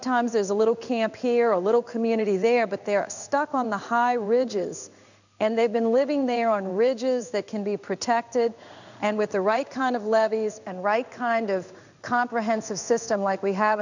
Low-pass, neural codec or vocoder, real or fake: 7.2 kHz; codec, 16 kHz in and 24 kHz out, 1 kbps, XY-Tokenizer; fake